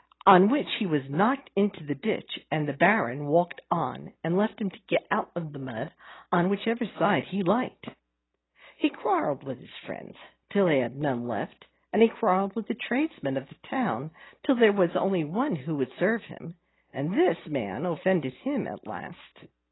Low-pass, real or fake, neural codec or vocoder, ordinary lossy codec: 7.2 kHz; real; none; AAC, 16 kbps